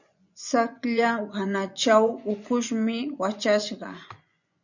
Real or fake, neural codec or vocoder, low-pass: fake; vocoder, 24 kHz, 100 mel bands, Vocos; 7.2 kHz